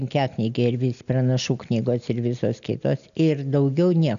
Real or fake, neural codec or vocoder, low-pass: real; none; 7.2 kHz